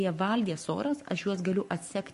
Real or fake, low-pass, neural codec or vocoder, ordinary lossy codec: fake; 14.4 kHz; codec, 44.1 kHz, 7.8 kbps, Pupu-Codec; MP3, 48 kbps